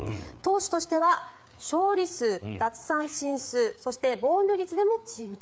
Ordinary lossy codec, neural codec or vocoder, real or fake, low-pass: none; codec, 16 kHz, 4 kbps, FreqCodec, larger model; fake; none